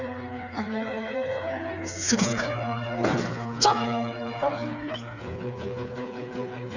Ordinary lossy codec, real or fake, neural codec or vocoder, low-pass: none; fake; codec, 16 kHz, 4 kbps, FreqCodec, smaller model; 7.2 kHz